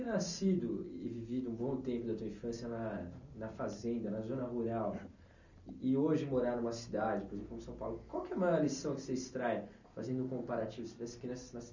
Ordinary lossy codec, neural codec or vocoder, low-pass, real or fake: none; none; 7.2 kHz; real